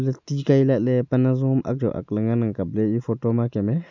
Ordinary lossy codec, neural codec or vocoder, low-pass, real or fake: none; none; 7.2 kHz; real